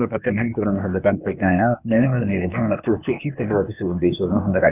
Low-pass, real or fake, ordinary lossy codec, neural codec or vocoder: 3.6 kHz; fake; none; codec, 16 kHz, 0.8 kbps, ZipCodec